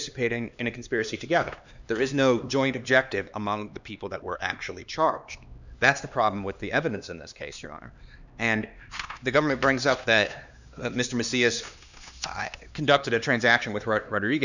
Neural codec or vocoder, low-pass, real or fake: codec, 16 kHz, 2 kbps, X-Codec, HuBERT features, trained on LibriSpeech; 7.2 kHz; fake